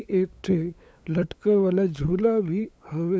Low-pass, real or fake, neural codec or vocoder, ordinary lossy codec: none; fake; codec, 16 kHz, 8 kbps, FunCodec, trained on LibriTTS, 25 frames a second; none